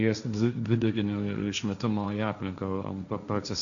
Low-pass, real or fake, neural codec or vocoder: 7.2 kHz; fake; codec, 16 kHz, 1.1 kbps, Voila-Tokenizer